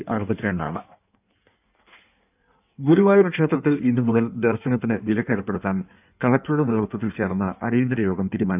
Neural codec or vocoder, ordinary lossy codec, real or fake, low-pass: codec, 16 kHz in and 24 kHz out, 1.1 kbps, FireRedTTS-2 codec; none; fake; 3.6 kHz